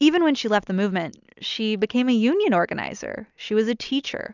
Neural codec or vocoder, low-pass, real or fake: none; 7.2 kHz; real